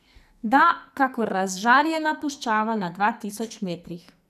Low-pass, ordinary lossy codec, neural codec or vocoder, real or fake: 14.4 kHz; none; codec, 44.1 kHz, 2.6 kbps, SNAC; fake